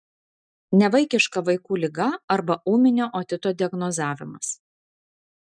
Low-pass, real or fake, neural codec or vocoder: 9.9 kHz; real; none